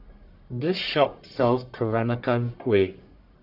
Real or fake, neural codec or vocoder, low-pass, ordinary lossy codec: fake; codec, 44.1 kHz, 1.7 kbps, Pupu-Codec; 5.4 kHz; none